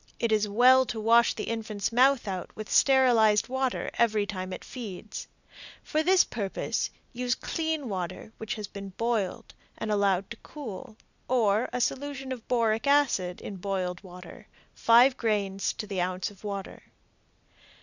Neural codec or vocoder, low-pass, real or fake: none; 7.2 kHz; real